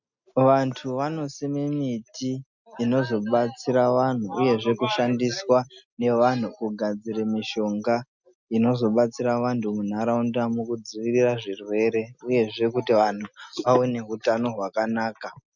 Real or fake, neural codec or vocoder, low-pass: real; none; 7.2 kHz